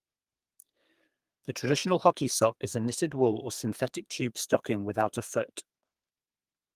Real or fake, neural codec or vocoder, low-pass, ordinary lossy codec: fake; codec, 32 kHz, 1.9 kbps, SNAC; 14.4 kHz; Opus, 32 kbps